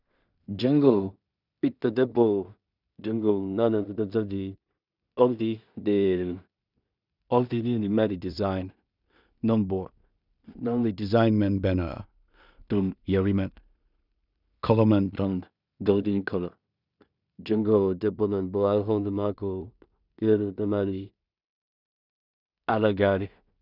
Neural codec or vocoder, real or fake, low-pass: codec, 16 kHz in and 24 kHz out, 0.4 kbps, LongCat-Audio-Codec, two codebook decoder; fake; 5.4 kHz